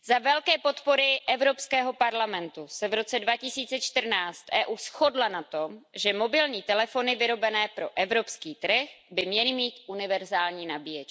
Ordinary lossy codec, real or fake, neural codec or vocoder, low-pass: none; real; none; none